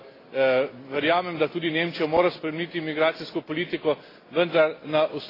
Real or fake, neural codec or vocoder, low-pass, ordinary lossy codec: real; none; 5.4 kHz; AAC, 24 kbps